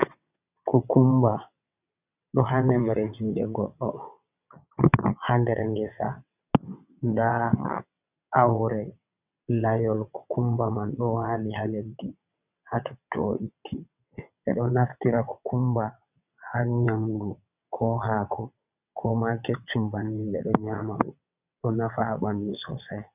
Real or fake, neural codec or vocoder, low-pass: fake; vocoder, 22.05 kHz, 80 mel bands, WaveNeXt; 3.6 kHz